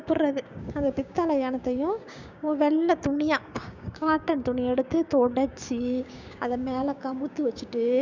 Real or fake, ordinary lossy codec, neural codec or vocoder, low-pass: fake; none; codec, 16 kHz, 6 kbps, DAC; 7.2 kHz